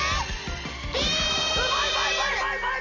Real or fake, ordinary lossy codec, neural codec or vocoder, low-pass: fake; none; vocoder, 44.1 kHz, 128 mel bands every 256 samples, BigVGAN v2; 7.2 kHz